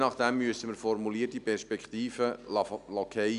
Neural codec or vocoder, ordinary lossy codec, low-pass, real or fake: none; none; 10.8 kHz; real